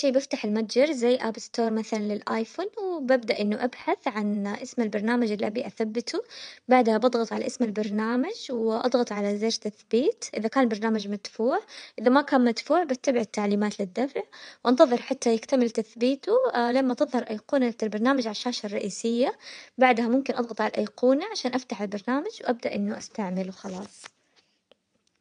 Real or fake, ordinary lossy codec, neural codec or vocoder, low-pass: fake; MP3, 96 kbps; vocoder, 22.05 kHz, 80 mel bands, WaveNeXt; 9.9 kHz